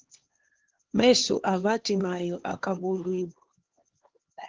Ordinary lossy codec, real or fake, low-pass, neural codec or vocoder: Opus, 16 kbps; fake; 7.2 kHz; codec, 16 kHz, 2 kbps, X-Codec, HuBERT features, trained on LibriSpeech